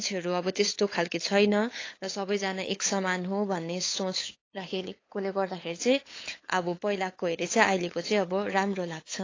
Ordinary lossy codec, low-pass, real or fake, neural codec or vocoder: AAC, 32 kbps; 7.2 kHz; fake; codec, 16 kHz, 8 kbps, FunCodec, trained on LibriTTS, 25 frames a second